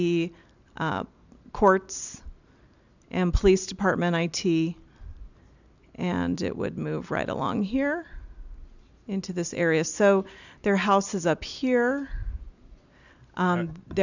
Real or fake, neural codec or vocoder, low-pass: real; none; 7.2 kHz